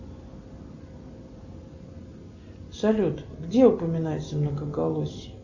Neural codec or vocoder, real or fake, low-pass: none; real; 7.2 kHz